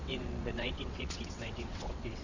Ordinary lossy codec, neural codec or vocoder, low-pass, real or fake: Opus, 64 kbps; vocoder, 22.05 kHz, 80 mel bands, WaveNeXt; 7.2 kHz; fake